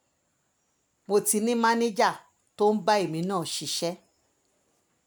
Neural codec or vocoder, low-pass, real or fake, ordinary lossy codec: none; none; real; none